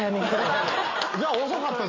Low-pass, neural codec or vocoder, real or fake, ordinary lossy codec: 7.2 kHz; none; real; MP3, 32 kbps